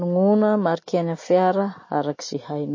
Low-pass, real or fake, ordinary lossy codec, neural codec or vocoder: 7.2 kHz; real; MP3, 32 kbps; none